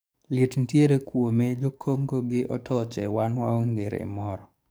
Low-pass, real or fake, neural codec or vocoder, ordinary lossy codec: none; fake; codec, 44.1 kHz, 7.8 kbps, DAC; none